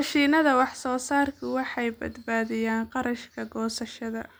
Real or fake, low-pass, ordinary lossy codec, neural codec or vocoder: real; none; none; none